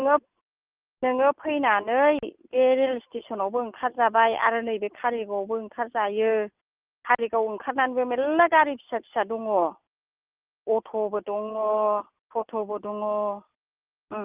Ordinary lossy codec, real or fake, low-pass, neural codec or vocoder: Opus, 32 kbps; real; 3.6 kHz; none